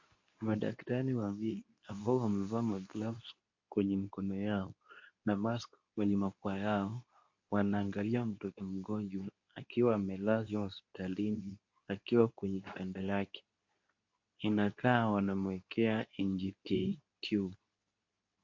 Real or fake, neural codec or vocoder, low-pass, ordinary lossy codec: fake; codec, 24 kHz, 0.9 kbps, WavTokenizer, medium speech release version 2; 7.2 kHz; MP3, 48 kbps